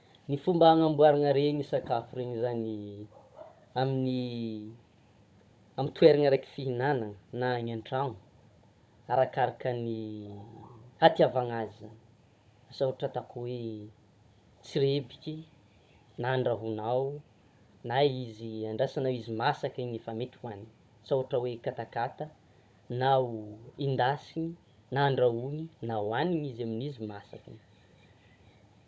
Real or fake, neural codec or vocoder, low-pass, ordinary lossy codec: fake; codec, 16 kHz, 16 kbps, FunCodec, trained on Chinese and English, 50 frames a second; none; none